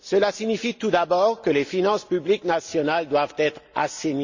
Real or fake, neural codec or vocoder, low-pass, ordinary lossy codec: real; none; 7.2 kHz; Opus, 64 kbps